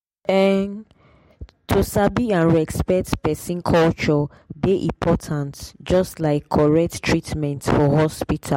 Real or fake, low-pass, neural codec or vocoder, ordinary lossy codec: real; 19.8 kHz; none; MP3, 64 kbps